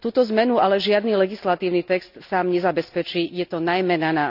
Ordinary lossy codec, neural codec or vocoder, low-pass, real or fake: none; none; 5.4 kHz; real